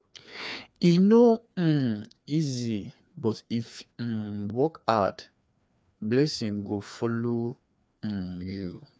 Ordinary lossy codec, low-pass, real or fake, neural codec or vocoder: none; none; fake; codec, 16 kHz, 2 kbps, FreqCodec, larger model